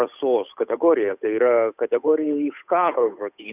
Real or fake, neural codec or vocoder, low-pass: fake; codec, 16 kHz, 8 kbps, FunCodec, trained on Chinese and English, 25 frames a second; 3.6 kHz